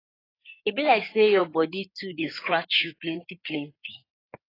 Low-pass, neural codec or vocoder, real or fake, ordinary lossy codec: 5.4 kHz; codec, 16 kHz in and 24 kHz out, 2.2 kbps, FireRedTTS-2 codec; fake; AAC, 24 kbps